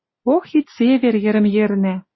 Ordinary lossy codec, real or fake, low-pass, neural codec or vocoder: MP3, 24 kbps; real; 7.2 kHz; none